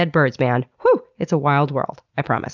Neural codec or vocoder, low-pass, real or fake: none; 7.2 kHz; real